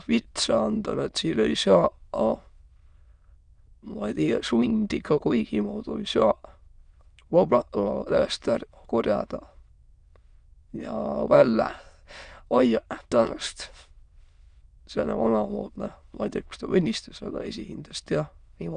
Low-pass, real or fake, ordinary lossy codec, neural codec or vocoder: 9.9 kHz; fake; Opus, 64 kbps; autoencoder, 22.05 kHz, a latent of 192 numbers a frame, VITS, trained on many speakers